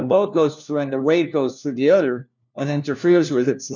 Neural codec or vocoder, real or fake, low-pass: codec, 16 kHz, 1 kbps, FunCodec, trained on LibriTTS, 50 frames a second; fake; 7.2 kHz